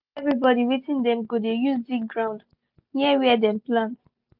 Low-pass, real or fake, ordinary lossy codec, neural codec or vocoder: 5.4 kHz; real; none; none